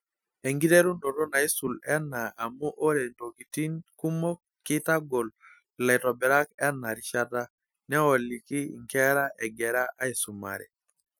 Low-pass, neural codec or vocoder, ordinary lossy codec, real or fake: none; none; none; real